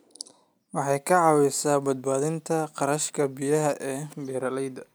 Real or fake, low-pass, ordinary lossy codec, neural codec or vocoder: real; none; none; none